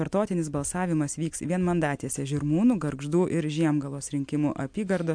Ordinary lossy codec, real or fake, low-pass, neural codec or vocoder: MP3, 64 kbps; real; 9.9 kHz; none